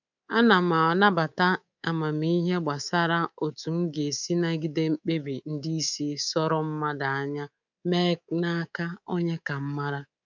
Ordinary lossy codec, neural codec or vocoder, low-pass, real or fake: none; codec, 24 kHz, 3.1 kbps, DualCodec; 7.2 kHz; fake